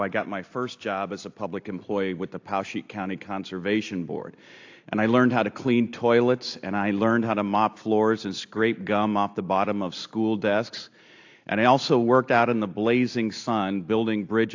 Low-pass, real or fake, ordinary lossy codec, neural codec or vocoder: 7.2 kHz; real; AAC, 48 kbps; none